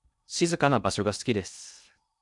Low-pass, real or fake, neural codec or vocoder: 10.8 kHz; fake; codec, 16 kHz in and 24 kHz out, 0.6 kbps, FocalCodec, streaming, 2048 codes